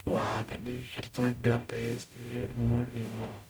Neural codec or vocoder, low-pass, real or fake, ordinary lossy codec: codec, 44.1 kHz, 0.9 kbps, DAC; none; fake; none